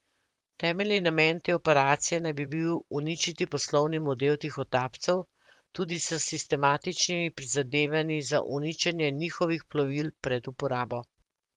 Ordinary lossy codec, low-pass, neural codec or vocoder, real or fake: Opus, 32 kbps; 19.8 kHz; vocoder, 44.1 kHz, 128 mel bands, Pupu-Vocoder; fake